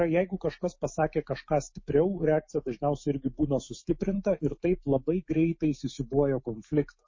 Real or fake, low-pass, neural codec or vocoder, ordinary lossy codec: fake; 7.2 kHz; autoencoder, 48 kHz, 128 numbers a frame, DAC-VAE, trained on Japanese speech; MP3, 32 kbps